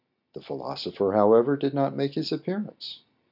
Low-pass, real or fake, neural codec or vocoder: 5.4 kHz; real; none